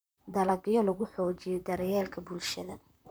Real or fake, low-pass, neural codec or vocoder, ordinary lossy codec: fake; none; vocoder, 44.1 kHz, 128 mel bands, Pupu-Vocoder; none